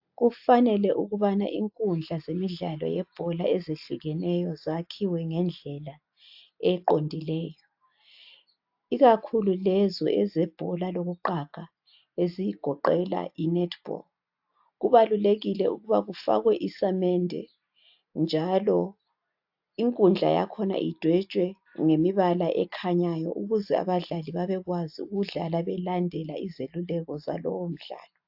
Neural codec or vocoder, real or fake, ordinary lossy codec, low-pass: none; real; AAC, 48 kbps; 5.4 kHz